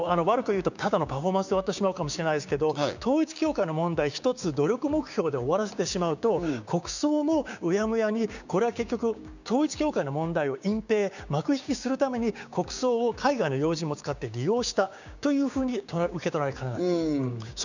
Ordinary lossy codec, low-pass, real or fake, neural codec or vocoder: none; 7.2 kHz; fake; codec, 16 kHz, 6 kbps, DAC